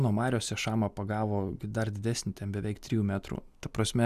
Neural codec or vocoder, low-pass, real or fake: none; 14.4 kHz; real